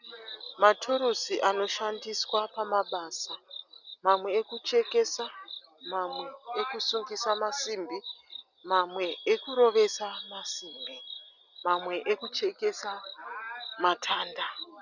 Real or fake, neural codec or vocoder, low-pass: real; none; 7.2 kHz